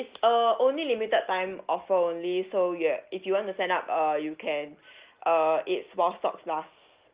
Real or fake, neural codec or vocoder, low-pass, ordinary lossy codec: real; none; 3.6 kHz; Opus, 32 kbps